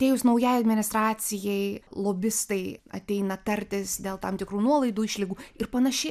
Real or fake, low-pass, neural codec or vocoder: real; 14.4 kHz; none